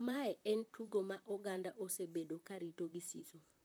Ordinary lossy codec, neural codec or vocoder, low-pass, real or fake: none; vocoder, 44.1 kHz, 128 mel bands, Pupu-Vocoder; none; fake